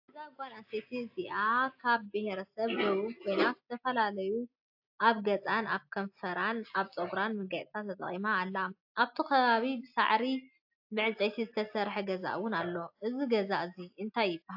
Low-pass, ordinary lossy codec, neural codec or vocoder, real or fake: 5.4 kHz; AAC, 48 kbps; none; real